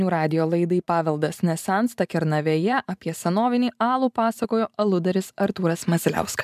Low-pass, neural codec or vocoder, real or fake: 14.4 kHz; vocoder, 44.1 kHz, 128 mel bands every 512 samples, BigVGAN v2; fake